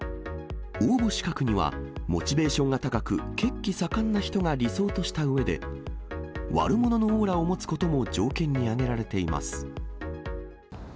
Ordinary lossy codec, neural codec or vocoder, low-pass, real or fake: none; none; none; real